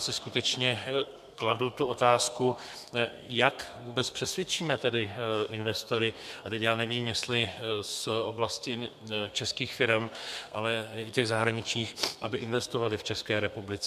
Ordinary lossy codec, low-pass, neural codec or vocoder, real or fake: MP3, 96 kbps; 14.4 kHz; codec, 32 kHz, 1.9 kbps, SNAC; fake